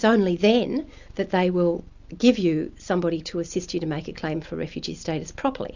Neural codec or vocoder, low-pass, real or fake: none; 7.2 kHz; real